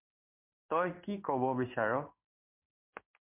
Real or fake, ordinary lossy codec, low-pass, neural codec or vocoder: fake; MP3, 32 kbps; 3.6 kHz; codec, 16 kHz in and 24 kHz out, 1 kbps, XY-Tokenizer